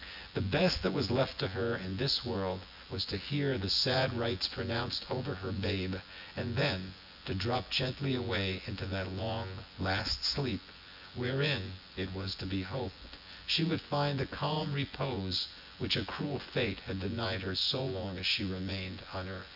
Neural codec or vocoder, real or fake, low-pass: vocoder, 24 kHz, 100 mel bands, Vocos; fake; 5.4 kHz